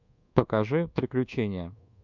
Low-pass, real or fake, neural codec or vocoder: 7.2 kHz; fake; codec, 24 kHz, 1.2 kbps, DualCodec